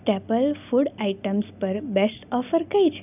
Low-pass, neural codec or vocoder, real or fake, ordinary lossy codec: 3.6 kHz; none; real; none